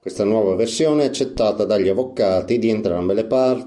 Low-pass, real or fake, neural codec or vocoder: 10.8 kHz; real; none